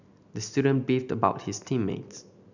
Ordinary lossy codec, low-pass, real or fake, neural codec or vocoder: none; 7.2 kHz; real; none